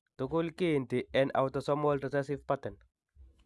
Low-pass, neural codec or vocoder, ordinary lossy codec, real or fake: none; none; none; real